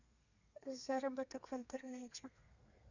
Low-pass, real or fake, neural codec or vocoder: 7.2 kHz; fake; codec, 32 kHz, 1.9 kbps, SNAC